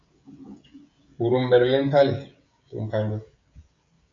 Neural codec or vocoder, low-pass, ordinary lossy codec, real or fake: codec, 16 kHz, 16 kbps, FreqCodec, smaller model; 7.2 kHz; MP3, 48 kbps; fake